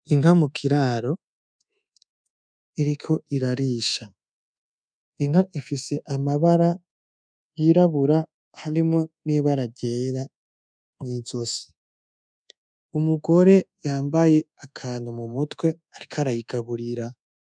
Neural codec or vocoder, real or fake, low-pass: codec, 24 kHz, 1.2 kbps, DualCodec; fake; 9.9 kHz